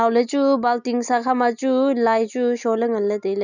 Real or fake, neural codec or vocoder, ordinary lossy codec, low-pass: real; none; none; 7.2 kHz